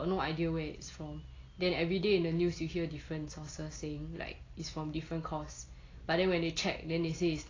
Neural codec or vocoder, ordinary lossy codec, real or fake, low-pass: none; AAC, 32 kbps; real; 7.2 kHz